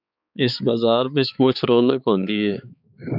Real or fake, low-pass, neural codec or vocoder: fake; 5.4 kHz; codec, 16 kHz, 4 kbps, X-Codec, HuBERT features, trained on balanced general audio